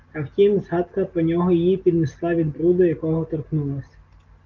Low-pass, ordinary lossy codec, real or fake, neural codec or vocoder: 7.2 kHz; Opus, 32 kbps; real; none